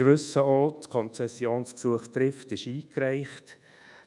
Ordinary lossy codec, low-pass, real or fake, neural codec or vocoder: MP3, 96 kbps; 10.8 kHz; fake; codec, 24 kHz, 1.2 kbps, DualCodec